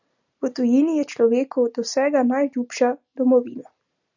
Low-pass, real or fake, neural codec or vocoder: 7.2 kHz; real; none